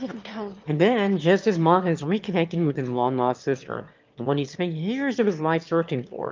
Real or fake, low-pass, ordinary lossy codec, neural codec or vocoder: fake; 7.2 kHz; Opus, 24 kbps; autoencoder, 22.05 kHz, a latent of 192 numbers a frame, VITS, trained on one speaker